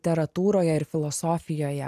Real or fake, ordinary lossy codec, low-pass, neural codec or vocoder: real; AAC, 96 kbps; 14.4 kHz; none